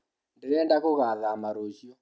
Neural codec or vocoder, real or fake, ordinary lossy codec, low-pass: none; real; none; none